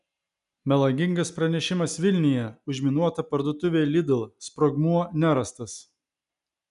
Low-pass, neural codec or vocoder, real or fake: 10.8 kHz; none; real